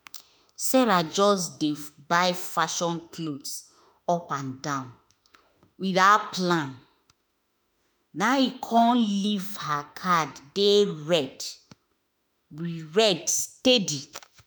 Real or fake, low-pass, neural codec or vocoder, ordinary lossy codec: fake; none; autoencoder, 48 kHz, 32 numbers a frame, DAC-VAE, trained on Japanese speech; none